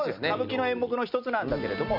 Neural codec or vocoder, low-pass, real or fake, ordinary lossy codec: none; 5.4 kHz; real; none